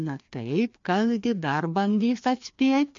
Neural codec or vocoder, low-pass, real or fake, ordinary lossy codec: codec, 16 kHz, 2 kbps, FreqCodec, larger model; 7.2 kHz; fake; MP3, 48 kbps